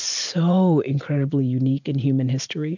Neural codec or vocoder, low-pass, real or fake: vocoder, 44.1 kHz, 128 mel bands every 512 samples, BigVGAN v2; 7.2 kHz; fake